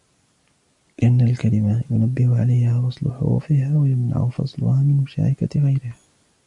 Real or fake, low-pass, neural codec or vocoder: real; 10.8 kHz; none